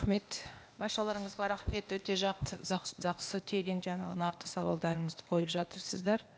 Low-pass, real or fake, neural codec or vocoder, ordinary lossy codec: none; fake; codec, 16 kHz, 0.8 kbps, ZipCodec; none